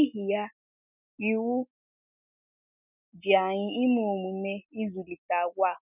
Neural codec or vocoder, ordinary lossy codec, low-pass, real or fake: none; none; 3.6 kHz; real